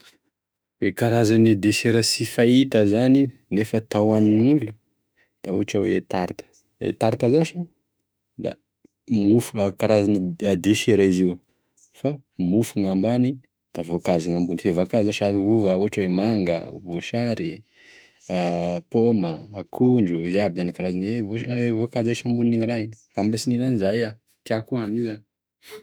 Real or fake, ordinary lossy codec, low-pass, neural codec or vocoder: fake; none; none; autoencoder, 48 kHz, 32 numbers a frame, DAC-VAE, trained on Japanese speech